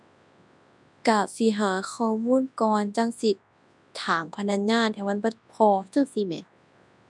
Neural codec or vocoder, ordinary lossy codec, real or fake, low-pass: codec, 24 kHz, 0.9 kbps, WavTokenizer, large speech release; none; fake; 10.8 kHz